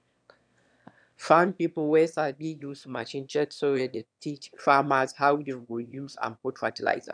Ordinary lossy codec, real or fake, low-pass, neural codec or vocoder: none; fake; 9.9 kHz; autoencoder, 22.05 kHz, a latent of 192 numbers a frame, VITS, trained on one speaker